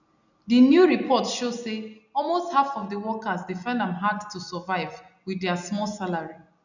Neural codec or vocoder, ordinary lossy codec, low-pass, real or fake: none; none; 7.2 kHz; real